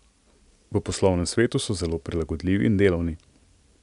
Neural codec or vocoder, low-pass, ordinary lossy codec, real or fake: none; 10.8 kHz; none; real